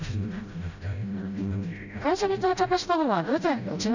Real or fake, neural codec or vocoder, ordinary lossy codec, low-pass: fake; codec, 16 kHz, 0.5 kbps, FreqCodec, smaller model; none; 7.2 kHz